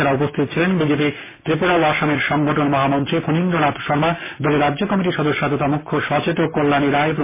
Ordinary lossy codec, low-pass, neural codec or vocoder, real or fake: MP3, 16 kbps; 3.6 kHz; none; real